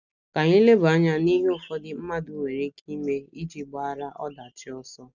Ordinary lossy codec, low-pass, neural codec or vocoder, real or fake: none; 7.2 kHz; none; real